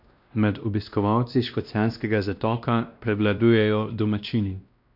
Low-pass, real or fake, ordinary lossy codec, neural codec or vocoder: 5.4 kHz; fake; none; codec, 16 kHz, 1 kbps, X-Codec, WavLM features, trained on Multilingual LibriSpeech